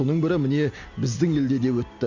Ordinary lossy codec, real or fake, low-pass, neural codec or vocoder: Opus, 64 kbps; real; 7.2 kHz; none